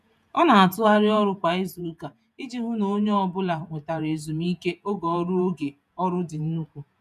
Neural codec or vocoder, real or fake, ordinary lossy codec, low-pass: vocoder, 48 kHz, 128 mel bands, Vocos; fake; none; 14.4 kHz